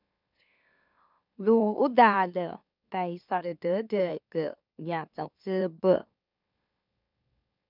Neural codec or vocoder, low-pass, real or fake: autoencoder, 44.1 kHz, a latent of 192 numbers a frame, MeloTTS; 5.4 kHz; fake